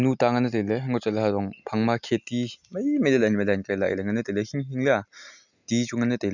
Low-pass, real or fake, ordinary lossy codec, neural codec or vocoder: 7.2 kHz; real; none; none